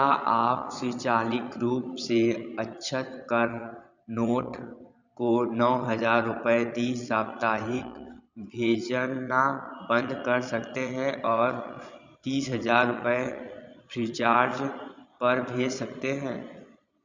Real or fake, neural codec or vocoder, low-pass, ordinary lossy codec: fake; vocoder, 22.05 kHz, 80 mel bands, Vocos; 7.2 kHz; Opus, 64 kbps